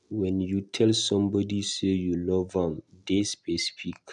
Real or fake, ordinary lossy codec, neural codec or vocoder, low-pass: real; none; none; 10.8 kHz